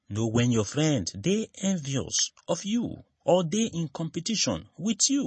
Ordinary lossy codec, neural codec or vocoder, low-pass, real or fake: MP3, 32 kbps; none; 10.8 kHz; real